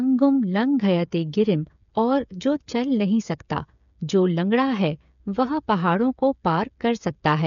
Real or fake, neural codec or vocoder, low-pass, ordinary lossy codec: fake; codec, 16 kHz, 8 kbps, FreqCodec, smaller model; 7.2 kHz; none